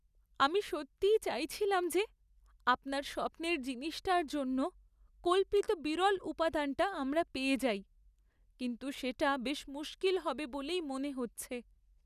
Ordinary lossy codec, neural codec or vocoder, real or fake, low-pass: none; none; real; 14.4 kHz